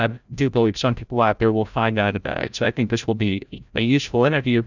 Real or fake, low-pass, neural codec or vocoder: fake; 7.2 kHz; codec, 16 kHz, 0.5 kbps, FreqCodec, larger model